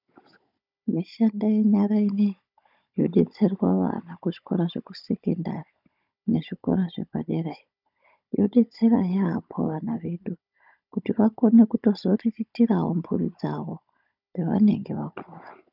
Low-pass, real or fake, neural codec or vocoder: 5.4 kHz; fake; codec, 16 kHz, 16 kbps, FunCodec, trained on Chinese and English, 50 frames a second